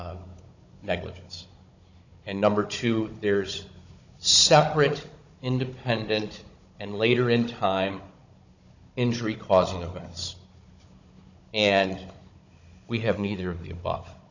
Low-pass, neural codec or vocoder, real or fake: 7.2 kHz; codec, 16 kHz, 16 kbps, FunCodec, trained on Chinese and English, 50 frames a second; fake